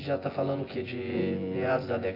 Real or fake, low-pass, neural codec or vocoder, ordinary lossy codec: fake; 5.4 kHz; vocoder, 24 kHz, 100 mel bands, Vocos; AAC, 24 kbps